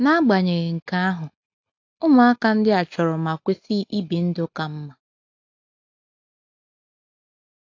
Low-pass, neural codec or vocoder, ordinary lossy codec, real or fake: 7.2 kHz; none; AAC, 48 kbps; real